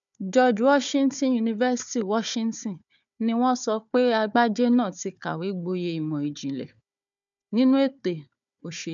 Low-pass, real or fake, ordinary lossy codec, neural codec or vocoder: 7.2 kHz; fake; none; codec, 16 kHz, 4 kbps, FunCodec, trained on Chinese and English, 50 frames a second